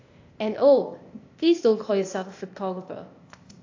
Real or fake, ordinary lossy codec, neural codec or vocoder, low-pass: fake; AAC, 48 kbps; codec, 16 kHz, 0.8 kbps, ZipCodec; 7.2 kHz